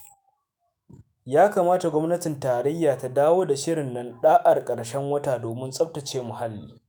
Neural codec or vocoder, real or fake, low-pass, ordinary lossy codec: autoencoder, 48 kHz, 128 numbers a frame, DAC-VAE, trained on Japanese speech; fake; none; none